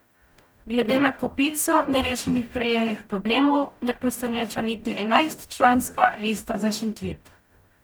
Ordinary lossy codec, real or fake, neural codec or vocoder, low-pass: none; fake; codec, 44.1 kHz, 0.9 kbps, DAC; none